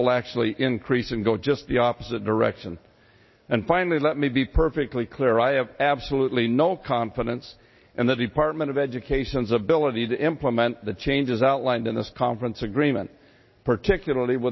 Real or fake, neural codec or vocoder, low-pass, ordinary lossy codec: real; none; 7.2 kHz; MP3, 24 kbps